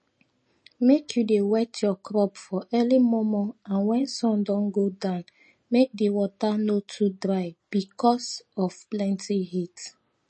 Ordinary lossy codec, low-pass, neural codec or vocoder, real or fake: MP3, 32 kbps; 10.8 kHz; none; real